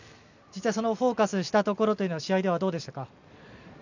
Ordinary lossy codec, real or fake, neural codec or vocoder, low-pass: none; real; none; 7.2 kHz